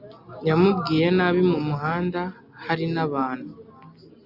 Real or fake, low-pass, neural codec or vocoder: real; 5.4 kHz; none